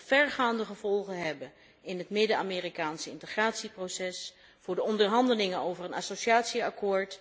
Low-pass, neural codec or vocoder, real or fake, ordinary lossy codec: none; none; real; none